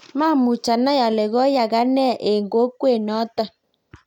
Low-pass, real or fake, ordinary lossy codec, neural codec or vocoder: 19.8 kHz; real; none; none